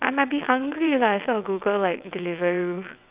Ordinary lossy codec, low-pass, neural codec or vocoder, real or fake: Opus, 64 kbps; 3.6 kHz; vocoder, 22.05 kHz, 80 mel bands, WaveNeXt; fake